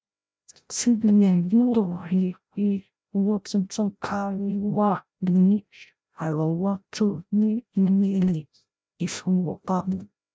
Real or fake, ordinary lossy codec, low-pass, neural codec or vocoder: fake; none; none; codec, 16 kHz, 0.5 kbps, FreqCodec, larger model